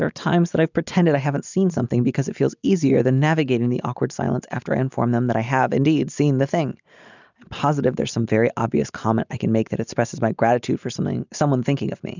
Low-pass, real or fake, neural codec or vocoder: 7.2 kHz; real; none